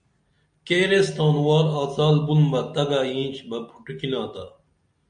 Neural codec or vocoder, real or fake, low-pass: none; real; 9.9 kHz